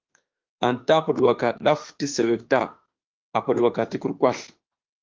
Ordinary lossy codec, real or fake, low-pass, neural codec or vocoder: Opus, 32 kbps; fake; 7.2 kHz; autoencoder, 48 kHz, 32 numbers a frame, DAC-VAE, trained on Japanese speech